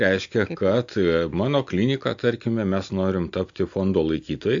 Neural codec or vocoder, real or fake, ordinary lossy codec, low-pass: none; real; AAC, 64 kbps; 7.2 kHz